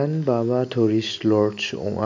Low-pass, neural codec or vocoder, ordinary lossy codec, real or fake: 7.2 kHz; none; MP3, 64 kbps; real